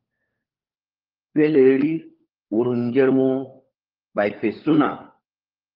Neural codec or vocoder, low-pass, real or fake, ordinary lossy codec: codec, 16 kHz, 4 kbps, FunCodec, trained on LibriTTS, 50 frames a second; 5.4 kHz; fake; Opus, 32 kbps